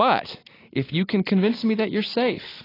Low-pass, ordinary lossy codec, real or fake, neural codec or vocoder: 5.4 kHz; AAC, 24 kbps; real; none